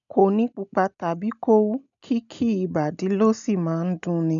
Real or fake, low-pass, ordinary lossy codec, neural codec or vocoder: real; 7.2 kHz; none; none